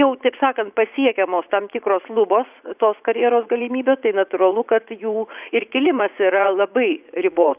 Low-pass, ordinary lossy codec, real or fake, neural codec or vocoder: 3.6 kHz; Opus, 64 kbps; fake; vocoder, 44.1 kHz, 80 mel bands, Vocos